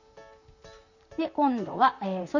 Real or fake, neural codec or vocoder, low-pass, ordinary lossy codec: fake; vocoder, 44.1 kHz, 128 mel bands, Pupu-Vocoder; 7.2 kHz; none